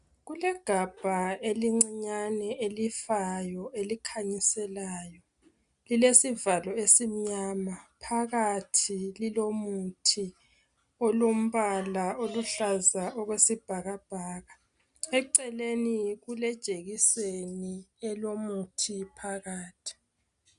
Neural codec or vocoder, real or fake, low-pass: none; real; 10.8 kHz